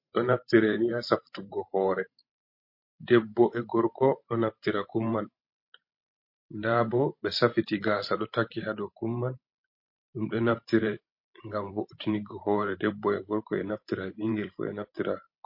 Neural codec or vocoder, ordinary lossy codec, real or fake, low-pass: vocoder, 44.1 kHz, 128 mel bands every 512 samples, BigVGAN v2; MP3, 32 kbps; fake; 5.4 kHz